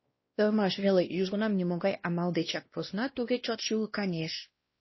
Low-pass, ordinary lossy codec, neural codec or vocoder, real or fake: 7.2 kHz; MP3, 24 kbps; codec, 16 kHz, 1 kbps, X-Codec, WavLM features, trained on Multilingual LibriSpeech; fake